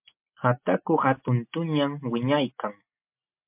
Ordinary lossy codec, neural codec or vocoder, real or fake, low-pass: MP3, 32 kbps; none; real; 3.6 kHz